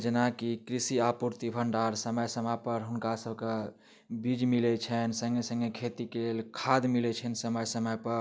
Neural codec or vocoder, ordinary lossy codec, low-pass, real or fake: none; none; none; real